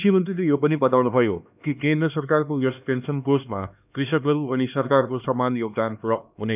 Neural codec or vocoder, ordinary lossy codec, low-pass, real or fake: codec, 16 kHz, 2 kbps, X-Codec, HuBERT features, trained on LibriSpeech; none; 3.6 kHz; fake